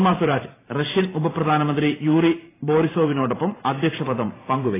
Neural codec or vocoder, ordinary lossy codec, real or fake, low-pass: vocoder, 44.1 kHz, 128 mel bands every 512 samples, BigVGAN v2; AAC, 16 kbps; fake; 3.6 kHz